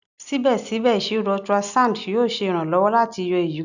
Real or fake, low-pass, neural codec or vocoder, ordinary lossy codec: real; 7.2 kHz; none; none